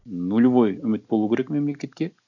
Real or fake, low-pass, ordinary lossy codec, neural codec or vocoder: real; 7.2 kHz; none; none